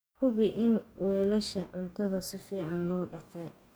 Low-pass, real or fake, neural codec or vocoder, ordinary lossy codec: none; fake; codec, 44.1 kHz, 2.6 kbps, DAC; none